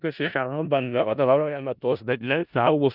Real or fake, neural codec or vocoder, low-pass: fake; codec, 16 kHz in and 24 kHz out, 0.4 kbps, LongCat-Audio-Codec, four codebook decoder; 5.4 kHz